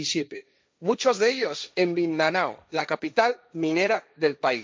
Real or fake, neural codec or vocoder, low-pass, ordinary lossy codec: fake; codec, 16 kHz, 1.1 kbps, Voila-Tokenizer; none; none